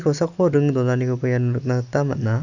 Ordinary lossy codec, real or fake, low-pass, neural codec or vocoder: none; real; 7.2 kHz; none